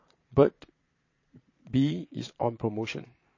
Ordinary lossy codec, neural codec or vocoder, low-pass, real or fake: MP3, 32 kbps; vocoder, 22.05 kHz, 80 mel bands, Vocos; 7.2 kHz; fake